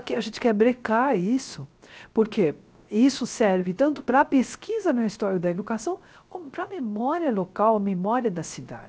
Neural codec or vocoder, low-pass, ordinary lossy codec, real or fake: codec, 16 kHz, 0.3 kbps, FocalCodec; none; none; fake